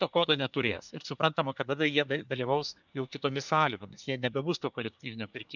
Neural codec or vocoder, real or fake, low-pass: codec, 24 kHz, 1 kbps, SNAC; fake; 7.2 kHz